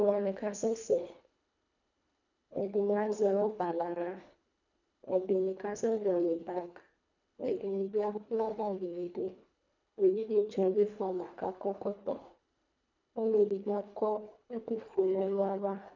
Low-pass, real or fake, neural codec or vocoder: 7.2 kHz; fake; codec, 24 kHz, 1.5 kbps, HILCodec